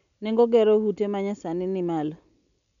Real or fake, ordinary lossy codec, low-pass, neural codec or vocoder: real; none; 7.2 kHz; none